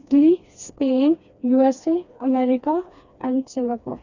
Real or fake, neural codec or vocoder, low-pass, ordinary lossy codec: fake; codec, 16 kHz, 2 kbps, FreqCodec, smaller model; 7.2 kHz; Opus, 64 kbps